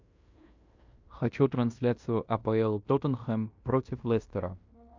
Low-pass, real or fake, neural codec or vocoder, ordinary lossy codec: 7.2 kHz; fake; codec, 16 kHz in and 24 kHz out, 0.9 kbps, LongCat-Audio-Codec, fine tuned four codebook decoder; MP3, 64 kbps